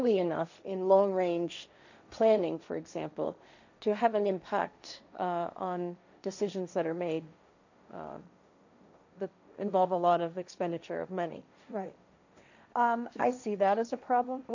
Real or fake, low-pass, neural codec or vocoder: fake; 7.2 kHz; codec, 16 kHz, 1.1 kbps, Voila-Tokenizer